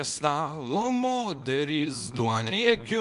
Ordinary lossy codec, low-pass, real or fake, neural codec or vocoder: MP3, 64 kbps; 10.8 kHz; fake; codec, 24 kHz, 0.9 kbps, WavTokenizer, small release